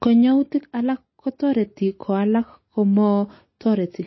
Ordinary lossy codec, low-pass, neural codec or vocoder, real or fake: MP3, 24 kbps; 7.2 kHz; none; real